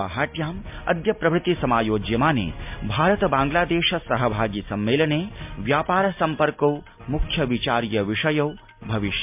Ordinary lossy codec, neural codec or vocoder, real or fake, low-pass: MP3, 32 kbps; none; real; 3.6 kHz